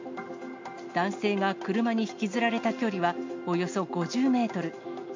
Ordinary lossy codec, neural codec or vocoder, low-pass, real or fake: MP3, 48 kbps; none; 7.2 kHz; real